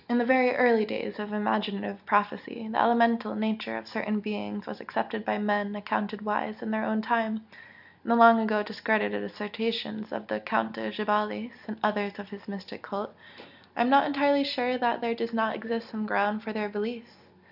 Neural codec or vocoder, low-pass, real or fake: none; 5.4 kHz; real